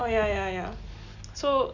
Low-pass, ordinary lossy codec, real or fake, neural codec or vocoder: 7.2 kHz; none; real; none